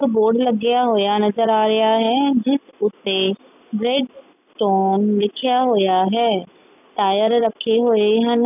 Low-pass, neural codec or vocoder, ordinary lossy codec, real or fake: 3.6 kHz; none; none; real